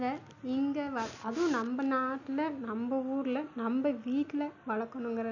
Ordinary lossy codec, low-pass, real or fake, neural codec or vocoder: none; 7.2 kHz; real; none